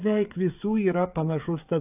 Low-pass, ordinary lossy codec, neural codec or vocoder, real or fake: 3.6 kHz; AAC, 32 kbps; codec, 16 kHz, 16 kbps, FreqCodec, smaller model; fake